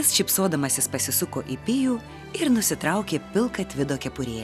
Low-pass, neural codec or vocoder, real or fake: 14.4 kHz; none; real